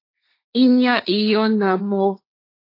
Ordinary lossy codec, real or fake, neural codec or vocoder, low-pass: AAC, 32 kbps; fake; codec, 16 kHz, 1.1 kbps, Voila-Tokenizer; 5.4 kHz